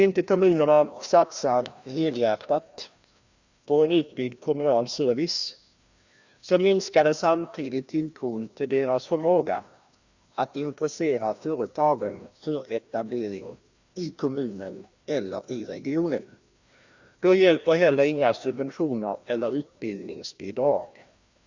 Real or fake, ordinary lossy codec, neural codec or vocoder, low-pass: fake; Opus, 64 kbps; codec, 16 kHz, 1 kbps, FreqCodec, larger model; 7.2 kHz